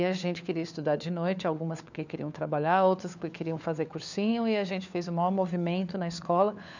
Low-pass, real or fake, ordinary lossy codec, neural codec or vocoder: 7.2 kHz; fake; none; codec, 16 kHz, 4 kbps, FunCodec, trained on LibriTTS, 50 frames a second